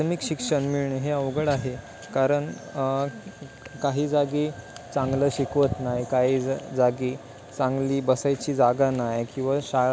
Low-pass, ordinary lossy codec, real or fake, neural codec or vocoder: none; none; real; none